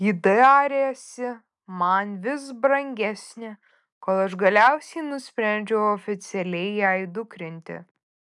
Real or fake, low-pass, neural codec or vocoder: real; 10.8 kHz; none